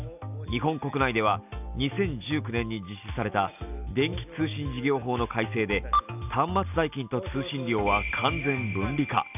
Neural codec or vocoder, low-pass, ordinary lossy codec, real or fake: none; 3.6 kHz; none; real